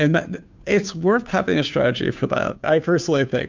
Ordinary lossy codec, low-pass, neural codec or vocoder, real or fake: MP3, 64 kbps; 7.2 kHz; codec, 16 kHz, 2 kbps, FunCodec, trained on Chinese and English, 25 frames a second; fake